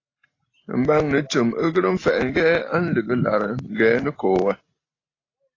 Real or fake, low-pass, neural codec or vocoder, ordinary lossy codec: real; 7.2 kHz; none; AAC, 32 kbps